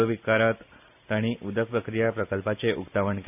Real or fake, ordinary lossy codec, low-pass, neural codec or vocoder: real; none; 3.6 kHz; none